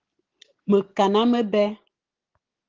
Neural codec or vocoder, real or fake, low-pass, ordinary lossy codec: none; real; 7.2 kHz; Opus, 16 kbps